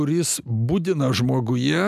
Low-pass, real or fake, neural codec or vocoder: 14.4 kHz; real; none